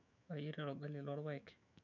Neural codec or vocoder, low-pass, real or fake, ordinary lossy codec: autoencoder, 48 kHz, 128 numbers a frame, DAC-VAE, trained on Japanese speech; 7.2 kHz; fake; none